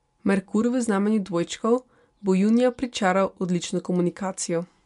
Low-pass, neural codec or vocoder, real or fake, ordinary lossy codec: 10.8 kHz; none; real; MP3, 64 kbps